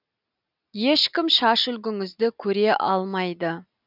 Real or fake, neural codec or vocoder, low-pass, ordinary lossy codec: real; none; 5.4 kHz; none